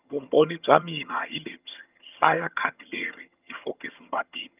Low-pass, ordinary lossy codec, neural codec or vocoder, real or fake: 3.6 kHz; Opus, 24 kbps; vocoder, 22.05 kHz, 80 mel bands, HiFi-GAN; fake